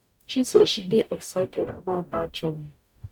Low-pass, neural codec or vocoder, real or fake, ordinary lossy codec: 19.8 kHz; codec, 44.1 kHz, 0.9 kbps, DAC; fake; none